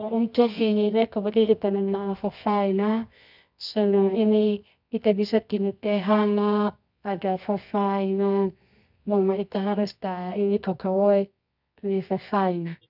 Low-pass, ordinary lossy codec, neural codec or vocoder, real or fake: 5.4 kHz; none; codec, 24 kHz, 0.9 kbps, WavTokenizer, medium music audio release; fake